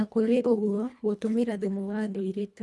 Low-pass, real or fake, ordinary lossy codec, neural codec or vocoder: none; fake; none; codec, 24 kHz, 1.5 kbps, HILCodec